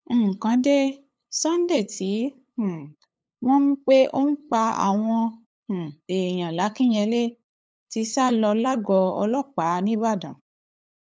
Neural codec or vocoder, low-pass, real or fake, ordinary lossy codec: codec, 16 kHz, 8 kbps, FunCodec, trained on LibriTTS, 25 frames a second; none; fake; none